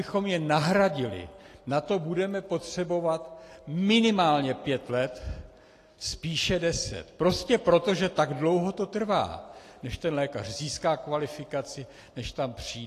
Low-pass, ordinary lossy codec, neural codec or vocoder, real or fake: 14.4 kHz; AAC, 48 kbps; none; real